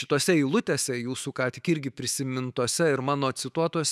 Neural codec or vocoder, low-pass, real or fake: autoencoder, 48 kHz, 128 numbers a frame, DAC-VAE, trained on Japanese speech; 14.4 kHz; fake